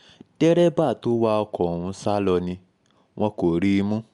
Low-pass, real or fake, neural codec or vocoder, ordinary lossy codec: 10.8 kHz; real; none; MP3, 64 kbps